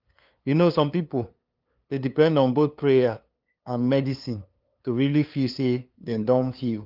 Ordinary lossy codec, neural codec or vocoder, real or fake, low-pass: Opus, 24 kbps; codec, 16 kHz, 2 kbps, FunCodec, trained on LibriTTS, 25 frames a second; fake; 5.4 kHz